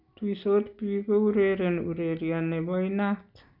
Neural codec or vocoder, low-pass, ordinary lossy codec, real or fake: none; 5.4 kHz; none; real